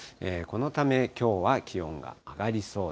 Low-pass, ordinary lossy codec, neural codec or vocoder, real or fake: none; none; none; real